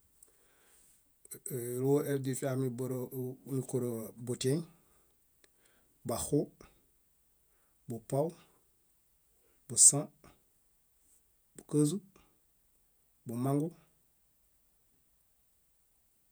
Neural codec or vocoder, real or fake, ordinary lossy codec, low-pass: vocoder, 48 kHz, 128 mel bands, Vocos; fake; none; none